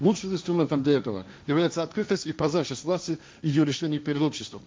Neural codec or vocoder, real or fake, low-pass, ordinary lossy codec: codec, 16 kHz, 1.1 kbps, Voila-Tokenizer; fake; none; none